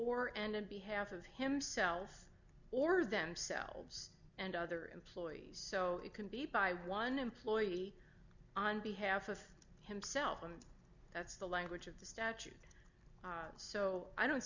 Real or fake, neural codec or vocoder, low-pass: real; none; 7.2 kHz